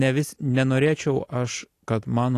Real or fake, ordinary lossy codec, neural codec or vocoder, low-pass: real; AAC, 48 kbps; none; 14.4 kHz